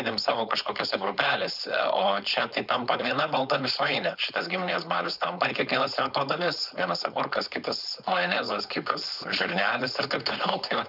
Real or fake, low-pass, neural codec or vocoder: fake; 5.4 kHz; codec, 16 kHz, 4.8 kbps, FACodec